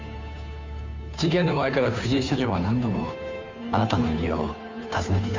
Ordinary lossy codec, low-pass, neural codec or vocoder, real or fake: MP3, 64 kbps; 7.2 kHz; codec, 16 kHz, 2 kbps, FunCodec, trained on Chinese and English, 25 frames a second; fake